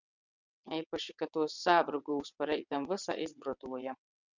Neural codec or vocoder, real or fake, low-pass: vocoder, 22.05 kHz, 80 mel bands, WaveNeXt; fake; 7.2 kHz